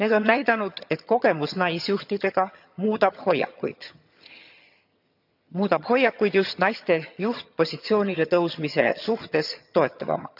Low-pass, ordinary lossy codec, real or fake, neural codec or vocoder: 5.4 kHz; none; fake; vocoder, 22.05 kHz, 80 mel bands, HiFi-GAN